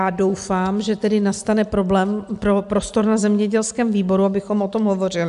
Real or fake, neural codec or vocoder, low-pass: real; none; 10.8 kHz